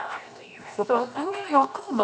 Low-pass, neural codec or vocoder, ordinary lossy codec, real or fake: none; codec, 16 kHz, 0.7 kbps, FocalCodec; none; fake